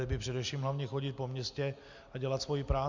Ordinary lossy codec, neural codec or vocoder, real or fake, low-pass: MP3, 64 kbps; none; real; 7.2 kHz